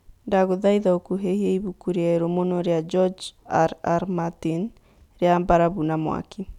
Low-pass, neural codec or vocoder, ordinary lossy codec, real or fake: 19.8 kHz; none; none; real